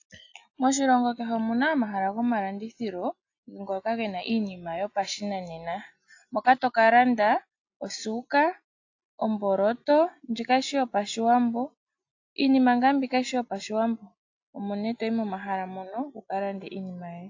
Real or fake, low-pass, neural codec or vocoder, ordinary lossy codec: real; 7.2 kHz; none; AAC, 48 kbps